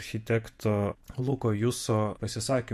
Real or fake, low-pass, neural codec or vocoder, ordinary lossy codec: fake; 14.4 kHz; vocoder, 48 kHz, 128 mel bands, Vocos; MP3, 64 kbps